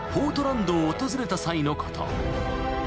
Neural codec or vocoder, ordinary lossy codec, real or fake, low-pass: none; none; real; none